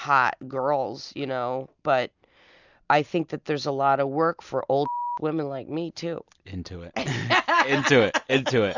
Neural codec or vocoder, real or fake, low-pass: none; real; 7.2 kHz